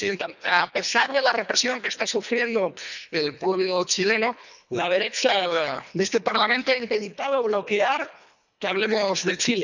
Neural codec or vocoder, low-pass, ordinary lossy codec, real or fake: codec, 24 kHz, 1.5 kbps, HILCodec; 7.2 kHz; none; fake